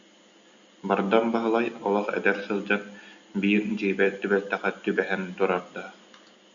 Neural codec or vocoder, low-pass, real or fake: none; 7.2 kHz; real